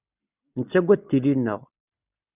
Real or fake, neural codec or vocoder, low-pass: real; none; 3.6 kHz